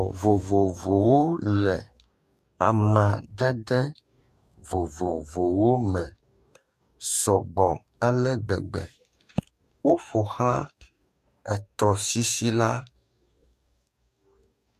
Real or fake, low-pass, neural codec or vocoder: fake; 14.4 kHz; codec, 44.1 kHz, 2.6 kbps, DAC